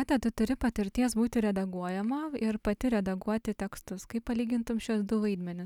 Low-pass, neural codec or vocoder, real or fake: 19.8 kHz; none; real